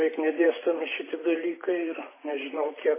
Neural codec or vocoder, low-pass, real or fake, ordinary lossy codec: vocoder, 44.1 kHz, 128 mel bands every 512 samples, BigVGAN v2; 3.6 kHz; fake; MP3, 16 kbps